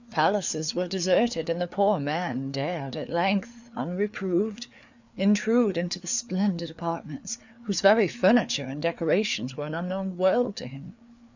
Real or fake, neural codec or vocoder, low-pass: fake; codec, 16 kHz, 4 kbps, FreqCodec, larger model; 7.2 kHz